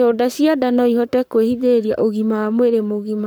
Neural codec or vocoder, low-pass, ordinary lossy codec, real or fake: codec, 44.1 kHz, 7.8 kbps, Pupu-Codec; none; none; fake